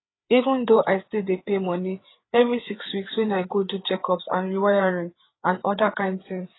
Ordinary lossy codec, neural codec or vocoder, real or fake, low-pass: AAC, 16 kbps; codec, 16 kHz, 4 kbps, FreqCodec, larger model; fake; 7.2 kHz